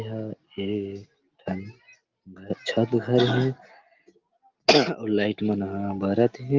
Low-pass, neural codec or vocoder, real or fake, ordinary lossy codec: 7.2 kHz; none; real; Opus, 32 kbps